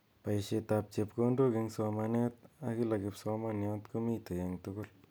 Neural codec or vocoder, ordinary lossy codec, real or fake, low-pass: none; none; real; none